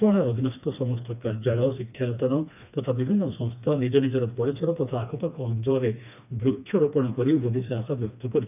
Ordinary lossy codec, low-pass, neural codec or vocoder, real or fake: none; 3.6 kHz; codec, 16 kHz, 2 kbps, FreqCodec, smaller model; fake